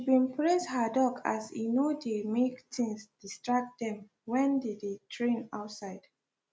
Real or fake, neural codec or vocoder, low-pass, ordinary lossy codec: real; none; none; none